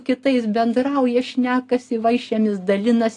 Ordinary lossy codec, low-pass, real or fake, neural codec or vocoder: AAC, 48 kbps; 10.8 kHz; real; none